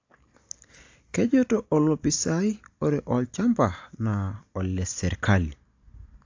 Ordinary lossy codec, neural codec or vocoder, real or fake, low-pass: AAC, 48 kbps; none; real; 7.2 kHz